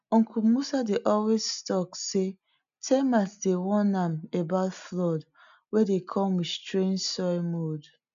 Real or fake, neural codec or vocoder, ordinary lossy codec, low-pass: real; none; none; 7.2 kHz